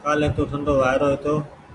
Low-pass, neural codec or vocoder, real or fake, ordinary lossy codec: 10.8 kHz; none; real; AAC, 48 kbps